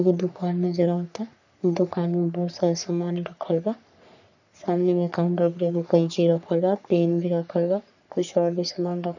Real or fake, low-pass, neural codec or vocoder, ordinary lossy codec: fake; 7.2 kHz; codec, 44.1 kHz, 3.4 kbps, Pupu-Codec; none